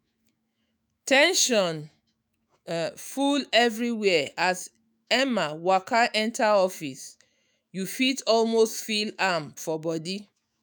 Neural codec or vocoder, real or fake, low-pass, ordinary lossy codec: autoencoder, 48 kHz, 128 numbers a frame, DAC-VAE, trained on Japanese speech; fake; none; none